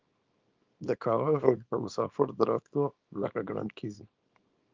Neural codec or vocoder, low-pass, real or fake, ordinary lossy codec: codec, 24 kHz, 0.9 kbps, WavTokenizer, small release; 7.2 kHz; fake; Opus, 24 kbps